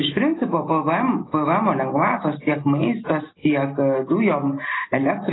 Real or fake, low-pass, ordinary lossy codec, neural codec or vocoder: real; 7.2 kHz; AAC, 16 kbps; none